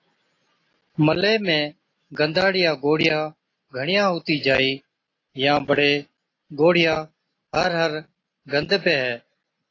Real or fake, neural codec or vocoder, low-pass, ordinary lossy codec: real; none; 7.2 kHz; AAC, 32 kbps